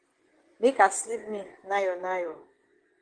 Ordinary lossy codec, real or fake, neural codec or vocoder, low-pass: Opus, 16 kbps; fake; vocoder, 24 kHz, 100 mel bands, Vocos; 9.9 kHz